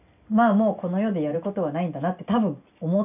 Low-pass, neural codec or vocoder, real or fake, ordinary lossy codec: 3.6 kHz; none; real; none